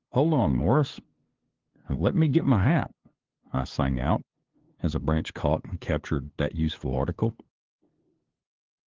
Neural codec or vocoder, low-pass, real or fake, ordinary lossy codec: codec, 16 kHz, 2 kbps, FunCodec, trained on LibriTTS, 25 frames a second; 7.2 kHz; fake; Opus, 16 kbps